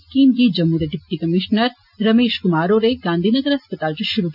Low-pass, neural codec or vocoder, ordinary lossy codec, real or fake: 5.4 kHz; none; none; real